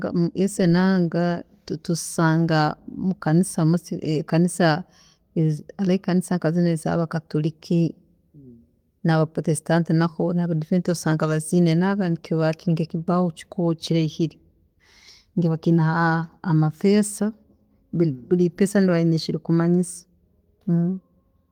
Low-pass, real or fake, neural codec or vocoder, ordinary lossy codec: 19.8 kHz; real; none; Opus, 24 kbps